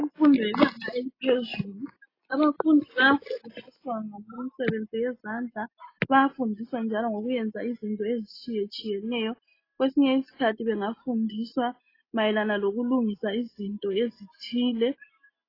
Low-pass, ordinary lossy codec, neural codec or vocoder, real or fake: 5.4 kHz; AAC, 24 kbps; none; real